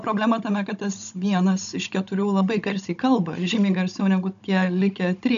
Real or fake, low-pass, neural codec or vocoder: fake; 7.2 kHz; codec, 16 kHz, 16 kbps, FunCodec, trained on Chinese and English, 50 frames a second